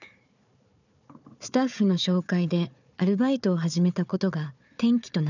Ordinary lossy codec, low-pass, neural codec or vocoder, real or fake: none; 7.2 kHz; codec, 16 kHz, 4 kbps, FunCodec, trained on Chinese and English, 50 frames a second; fake